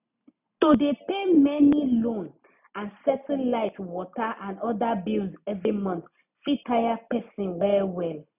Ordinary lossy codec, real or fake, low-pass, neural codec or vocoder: none; real; 3.6 kHz; none